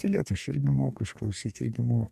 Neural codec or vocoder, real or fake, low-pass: codec, 44.1 kHz, 2.6 kbps, DAC; fake; 14.4 kHz